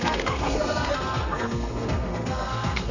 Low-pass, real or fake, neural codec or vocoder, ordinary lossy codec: 7.2 kHz; fake; codec, 32 kHz, 1.9 kbps, SNAC; none